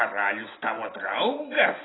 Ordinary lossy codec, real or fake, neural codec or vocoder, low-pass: AAC, 16 kbps; real; none; 7.2 kHz